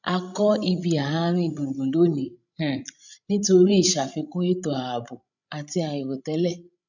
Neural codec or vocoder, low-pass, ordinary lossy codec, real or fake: codec, 16 kHz, 16 kbps, FreqCodec, larger model; 7.2 kHz; none; fake